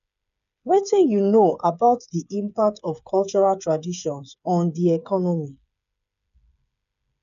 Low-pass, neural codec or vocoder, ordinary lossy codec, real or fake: 7.2 kHz; codec, 16 kHz, 8 kbps, FreqCodec, smaller model; none; fake